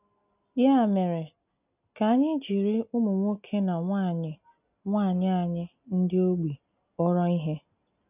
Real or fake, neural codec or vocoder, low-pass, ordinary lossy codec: real; none; 3.6 kHz; none